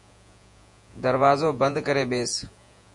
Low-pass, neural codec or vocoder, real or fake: 10.8 kHz; vocoder, 48 kHz, 128 mel bands, Vocos; fake